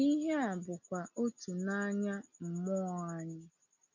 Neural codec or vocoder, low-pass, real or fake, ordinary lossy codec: none; 7.2 kHz; real; none